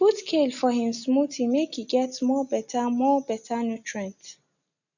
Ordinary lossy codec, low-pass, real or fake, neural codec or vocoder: none; 7.2 kHz; real; none